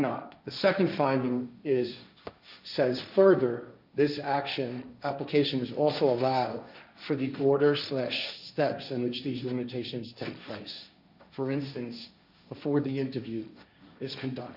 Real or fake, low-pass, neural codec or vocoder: fake; 5.4 kHz; codec, 16 kHz, 1.1 kbps, Voila-Tokenizer